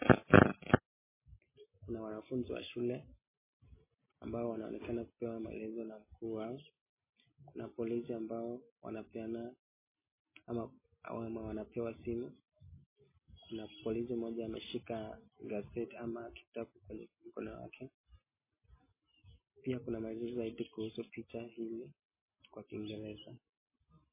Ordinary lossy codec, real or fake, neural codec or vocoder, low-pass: MP3, 16 kbps; real; none; 3.6 kHz